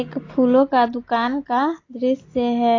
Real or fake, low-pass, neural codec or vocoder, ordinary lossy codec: real; 7.2 kHz; none; AAC, 32 kbps